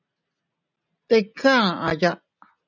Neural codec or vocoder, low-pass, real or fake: none; 7.2 kHz; real